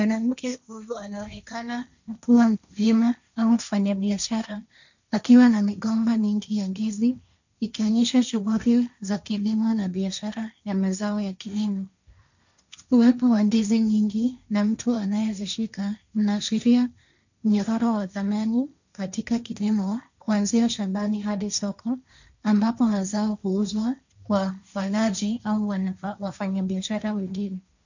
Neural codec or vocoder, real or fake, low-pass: codec, 16 kHz, 1.1 kbps, Voila-Tokenizer; fake; 7.2 kHz